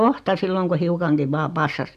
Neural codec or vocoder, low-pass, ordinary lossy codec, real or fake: none; 14.4 kHz; none; real